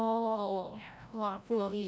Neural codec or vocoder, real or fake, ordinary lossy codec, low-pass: codec, 16 kHz, 0.5 kbps, FreqCodec, larger model; fake; none; none